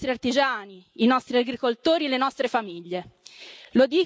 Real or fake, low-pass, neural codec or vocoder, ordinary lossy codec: real; none; none; none